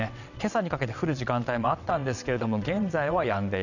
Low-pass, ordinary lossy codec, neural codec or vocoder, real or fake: 7.2 kHz; none; vocoder, 22.05 kHz, 80 mel bands, WaveNeXt; fake